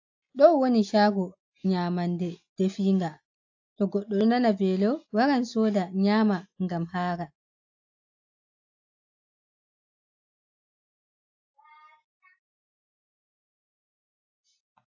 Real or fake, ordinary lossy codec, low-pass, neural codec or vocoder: real; AAC, 48 kbps; 7.2 kHz; none